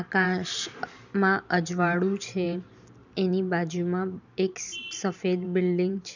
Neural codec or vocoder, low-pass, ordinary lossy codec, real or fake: vocoder, 44.1 kHz, 128 mel bands every 512 samples, BigVGAN v2; 7.2 kHz; none; fake